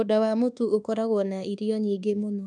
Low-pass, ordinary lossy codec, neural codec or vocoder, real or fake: none; none; codec, 24 kHz, 1.2 kbps, DualCodec; fake